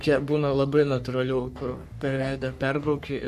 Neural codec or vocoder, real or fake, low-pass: codec, 44.1 kHz, 3.4 kbps, Pupu-Codec; fake; 14.4 kHz